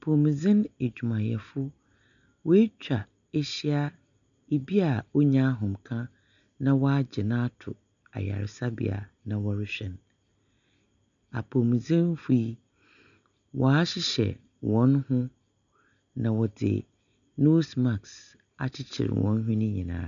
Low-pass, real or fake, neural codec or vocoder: 7.2 kHz; real; none